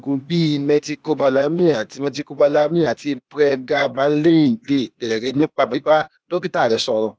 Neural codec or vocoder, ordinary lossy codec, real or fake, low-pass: codec, 16 kHz, 0.8 kbps, ZipCodec; none; fake; none